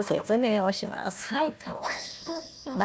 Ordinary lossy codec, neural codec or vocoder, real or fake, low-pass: none; codec, 16 kHz, 1 kbps, FunCodec, trained on Chinese and English, 50 frames a second; fake; none